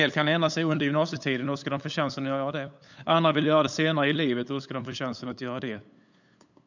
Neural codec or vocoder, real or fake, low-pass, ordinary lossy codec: codec, 16 kHz, 8 kbps, FunCodec, trained on LibriTTS, 25 frames a second; fake; 7.2 kHz; none